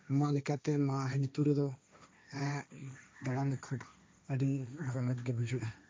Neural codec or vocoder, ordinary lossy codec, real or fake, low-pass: codec, 16 kHz, 1.1 kbps, Voila-Tokenizer; none; fake; none